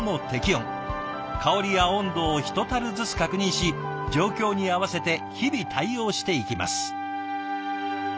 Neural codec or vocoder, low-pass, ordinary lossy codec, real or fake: none; none; none; real